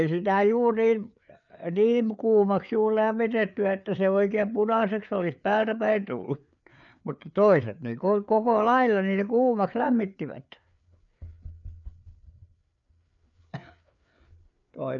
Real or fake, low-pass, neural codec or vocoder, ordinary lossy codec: fake; 7.2 kHz; codec, 16 kHz, 4 kbps, FreqCodec, larger model; none